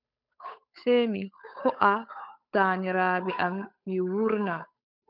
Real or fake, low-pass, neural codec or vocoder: fake; 5.4 kHz; codec, 16 kHz, 8 kbps, FunCodec, trained on Chinese and English, 25 frames a second